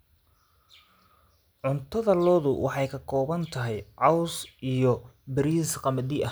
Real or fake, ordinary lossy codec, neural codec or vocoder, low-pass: real; none; none; none